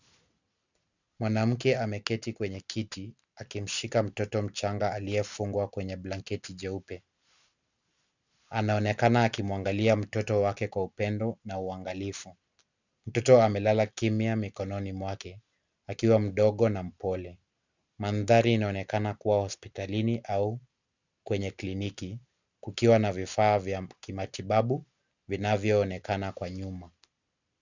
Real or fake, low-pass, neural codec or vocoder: real; 7.2 kHz; none